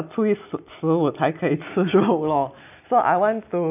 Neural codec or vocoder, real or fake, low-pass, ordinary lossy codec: none; real; 3.6 kHz; none